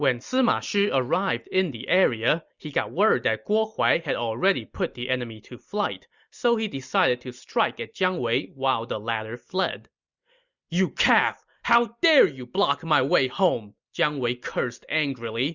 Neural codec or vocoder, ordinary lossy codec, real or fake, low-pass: none; Opus, 64 kbps; real; 7.2 kHz